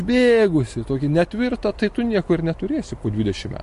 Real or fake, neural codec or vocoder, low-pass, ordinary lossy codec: real; none; 14.4 kHz; MP3, 48 kbps